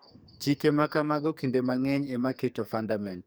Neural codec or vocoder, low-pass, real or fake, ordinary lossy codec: codec, 44.1 kHz, 2.6 kbps, SNAC; none; fake; none